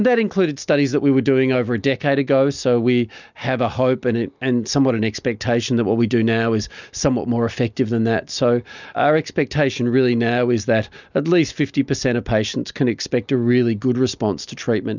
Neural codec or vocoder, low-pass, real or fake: none; 7.2 kHz; real